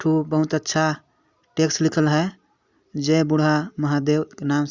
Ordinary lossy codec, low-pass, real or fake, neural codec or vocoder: Opus, 64 kbps; 7.2 kHz; real; none